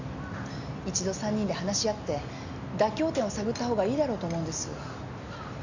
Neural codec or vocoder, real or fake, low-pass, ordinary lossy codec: none; real; 7.2 kHz; none